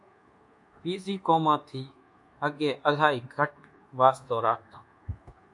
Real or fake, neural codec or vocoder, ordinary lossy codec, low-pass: fake; codec, 24 kHz, 1.2 kbps, DualCodec; MP3, 64 kbps; 10.8 kHz